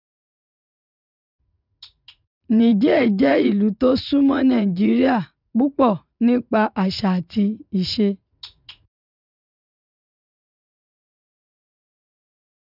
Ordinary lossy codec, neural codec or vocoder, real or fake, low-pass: none; vocoder, 44.1 kHz, 80 mel bands, Vocos; fake; 5.4 kHz